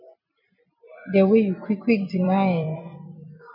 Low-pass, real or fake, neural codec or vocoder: 5.4 kHz; fake; vocoder, 44.1 kHz, 128 mel bands every 256 samples, BigVGAN v2